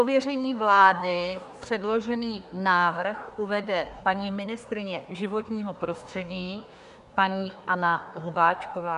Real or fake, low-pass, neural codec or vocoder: fake; 10.8 kHz; codec, 24 kHz, 1 kbps, SNAC